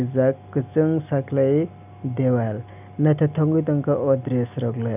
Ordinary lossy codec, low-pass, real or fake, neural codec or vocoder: none; 3.6 kHz; real; none